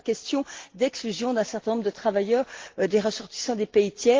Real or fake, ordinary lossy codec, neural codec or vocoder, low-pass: real; Opus, 16 kbps; none; 7.2 kHz